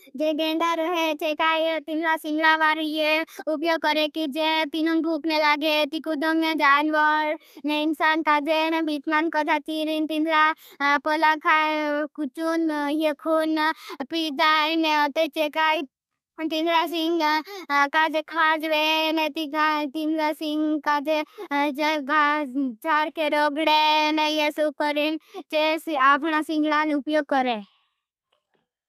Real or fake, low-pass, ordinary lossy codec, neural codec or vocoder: fake; 14.4 kHz; none; codec, 32 kHz, 1.9 kbps, SNAC